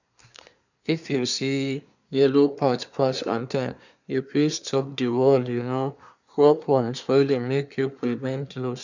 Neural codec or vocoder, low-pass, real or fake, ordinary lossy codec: codec, 24 kHz, 1 kbps, SNAC; 7.2 kHz; fake; none